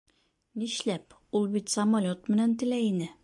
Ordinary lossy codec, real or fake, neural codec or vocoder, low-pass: AAC, 64 kbps; real; none; 10.8 kHz